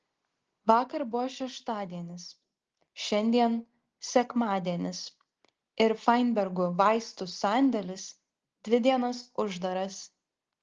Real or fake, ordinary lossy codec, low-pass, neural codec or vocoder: real; Opus, 16 kbps; 7.2 kHz; none